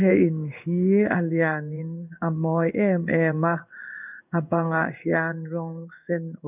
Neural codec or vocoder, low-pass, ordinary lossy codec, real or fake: codec, 16 kHz in and 24 kHz out, 1 kbps, XY-Tokenizer; 3.6 kHz; none; fake